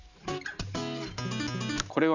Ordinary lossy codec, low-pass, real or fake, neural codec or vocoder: none; 7.2 kHz; real; none